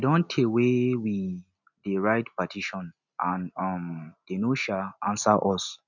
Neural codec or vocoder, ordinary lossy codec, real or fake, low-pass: none; none; real; 7.2 kHz